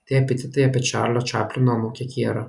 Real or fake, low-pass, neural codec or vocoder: real; 10.8 kHz; none